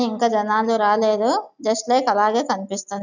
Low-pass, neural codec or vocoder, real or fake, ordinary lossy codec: 7.2 kHz; none; real; none